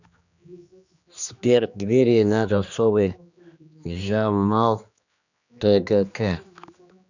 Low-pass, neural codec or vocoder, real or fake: 7.2 kHz; codec, 16 kHz, 2 kbps, X-Codec, HuBERT features, trained on general audio; fake